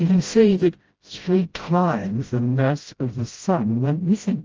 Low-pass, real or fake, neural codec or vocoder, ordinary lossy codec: 7.2 kHz; fake; codec, 16 kHz, 0.5 kbps, FreqCodec, smaller model; Opus, 24 kbps